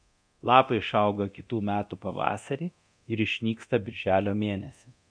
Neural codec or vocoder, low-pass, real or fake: codec, 24 kHz, 0.9 kbps, DualCodec; 9.9 kHz; fake